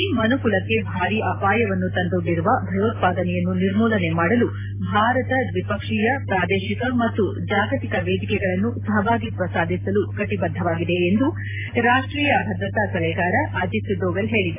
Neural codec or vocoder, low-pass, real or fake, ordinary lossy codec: none; 3.6 kHz; real; AAC, 24 kbps